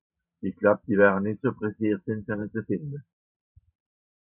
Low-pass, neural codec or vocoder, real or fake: 3.6 kHz; vocoder, 44.1 kHz, 128 mel bands every 512 samples, BigVGAN v2; fake